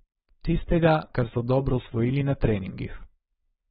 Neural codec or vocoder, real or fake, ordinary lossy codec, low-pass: codec, 16 kHz, 4.8 kbps, FACodec; fake; AAC, 16 kbps; 7.2 kHz